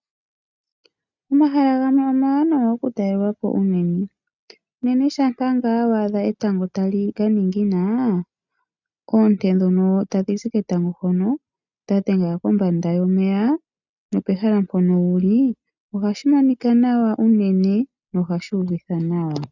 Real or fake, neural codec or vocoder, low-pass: real; none; 7.2 kHz